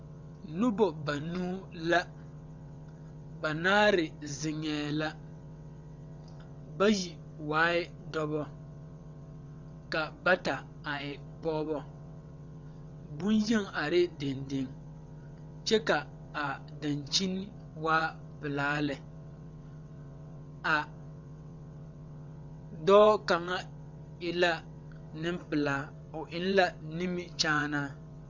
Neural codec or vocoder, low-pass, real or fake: vocoder, 22.05 kHz, 80 mel bands, WaveNeXt; 7.2 kHz; fake